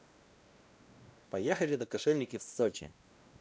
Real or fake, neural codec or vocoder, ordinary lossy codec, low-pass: fake; codec, 16 kHz, 1 kbps, X-Codec, WavLM features, trained on Multilingual LibriSpeech; none; none